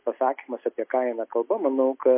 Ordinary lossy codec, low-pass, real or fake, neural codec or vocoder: MP3, 24 kbps; 3.6 kHz; real; none